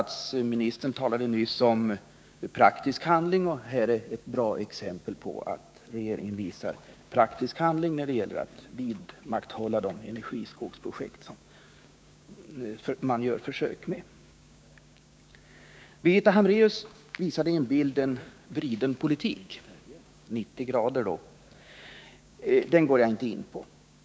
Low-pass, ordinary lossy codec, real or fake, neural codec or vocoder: none; none; fake; codec, 16 kHz, 6 kbps, DAC